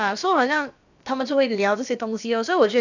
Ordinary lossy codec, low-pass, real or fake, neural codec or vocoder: none; 7.2 kHz; fake; codec, 16 kHz, about 1 kbps, DyCAST, with the encoder's durations